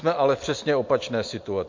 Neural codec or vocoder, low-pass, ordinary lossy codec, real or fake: none; 7.2 kHz; MP3, 48 kbps; real